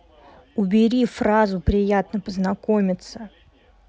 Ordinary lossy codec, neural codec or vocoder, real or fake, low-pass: none; none; real; none